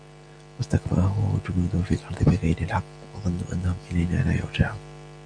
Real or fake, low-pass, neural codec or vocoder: real; 9.9 kHz; none